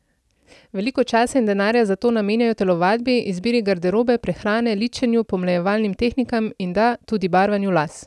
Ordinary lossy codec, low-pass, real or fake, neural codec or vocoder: none; none; real; none